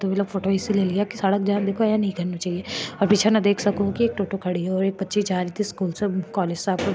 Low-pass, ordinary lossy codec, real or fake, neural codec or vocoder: none; none; real; none